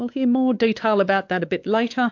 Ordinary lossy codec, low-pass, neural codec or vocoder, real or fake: AAC, 48 kbps; 7.2 kHz; codec, 16 kHz, 4 kbps, X-Codec, WavLM features, trained on Multilingual LibriSpeech; fake